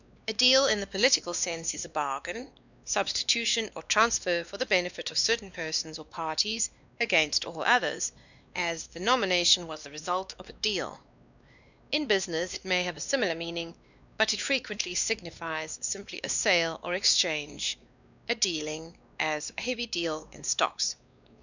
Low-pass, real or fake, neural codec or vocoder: 7.2 kHz; fake; codec, 16 kHz, 2 kbps, X-Codec, WavLM features, trained on Multilingual LibriSpeech